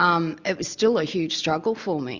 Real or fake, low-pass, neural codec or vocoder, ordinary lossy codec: real; 7.2 kHz; none; Opus, 64 kbps